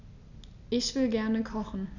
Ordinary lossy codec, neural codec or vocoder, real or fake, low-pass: none; none; real; 7.2 kHz